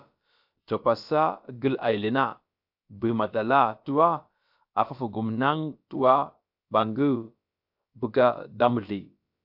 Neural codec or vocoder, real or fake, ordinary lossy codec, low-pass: codec, 16 kHz, about 1 kbps, DyCAST, with the encoder's durations; fake; AAC, 48 kbps; 5.4 kHz